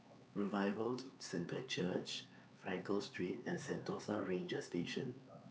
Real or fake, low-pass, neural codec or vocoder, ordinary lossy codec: fake; none; codec, 16 kHz, 4 kbps, X-Codec, HuBERT features, trained on LibriSpeech; none